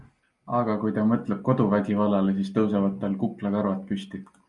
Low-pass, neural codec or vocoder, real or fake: 10.8 kHz; none; real